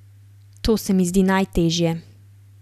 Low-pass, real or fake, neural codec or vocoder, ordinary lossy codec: 14.4 kHz; real; none; none